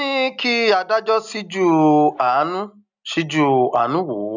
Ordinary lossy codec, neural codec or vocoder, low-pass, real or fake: none; none; 7.2 kHz; real